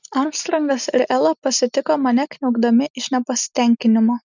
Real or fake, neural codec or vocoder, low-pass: real; none; 7.2 kHz